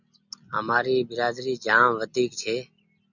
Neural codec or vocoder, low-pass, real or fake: none; 7.2 kHz; real